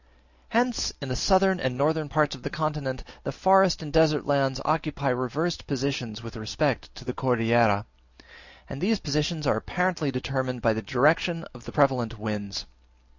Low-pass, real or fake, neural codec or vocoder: 7.2 kHz; real; none